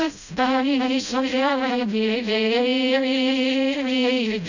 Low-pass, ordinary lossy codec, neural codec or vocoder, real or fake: 7.2 kHz; none; codec, 16 kHz, 0.5 kbps, FreqCodec, smaller model; fake